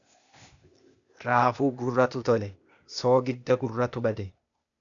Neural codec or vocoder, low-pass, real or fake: codec, 16 kHz, 0.8 kbps, ZipCodec; 7.2 kHz; fake